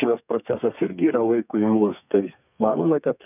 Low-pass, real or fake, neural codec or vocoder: 3.6 kHz; fake; codec, 32 kHz, 1.9 kbps, SNAC